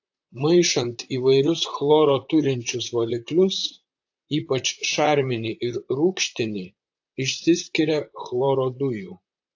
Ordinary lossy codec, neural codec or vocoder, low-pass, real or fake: AAC, 48 kbps; vocoder, 44.1 kHz, 128 mel bands, Pupu-Vocoder; 7.2 kHz; fake